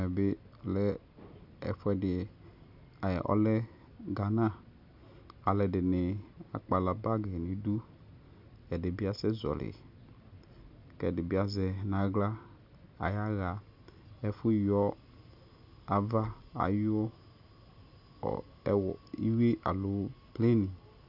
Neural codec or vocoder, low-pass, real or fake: none; 5.4 kHz; real